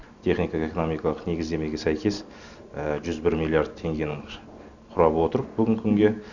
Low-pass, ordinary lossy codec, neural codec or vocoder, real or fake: 7.2 kHz; none; none; real